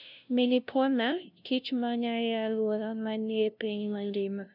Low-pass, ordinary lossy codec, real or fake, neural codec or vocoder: 5.4 kHz; none; fake; codec, 16 kHz, 0.5 kbps, FunCodec, trained on LibriTTS, 25 frames a second